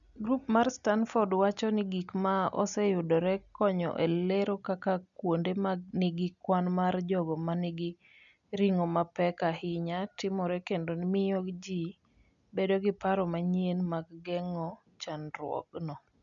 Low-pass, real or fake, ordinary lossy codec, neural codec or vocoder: 7.2 kHz; real; none; none